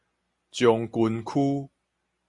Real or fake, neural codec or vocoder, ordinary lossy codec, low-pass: real; none; MP3, 48 kbps; 10.8 kHz